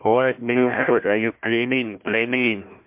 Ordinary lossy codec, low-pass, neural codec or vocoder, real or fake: MP3, 32 kbps; 3.6 kHz; codec, 16 kHz, 1 kbps, FunCodec, trained on Chinese and English, 50 frames a second; fake